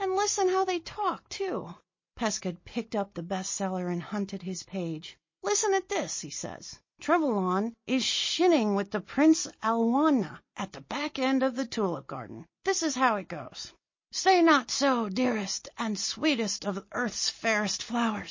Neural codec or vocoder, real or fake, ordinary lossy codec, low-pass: none; real; MP3, 32 kbps; 7.2 kHz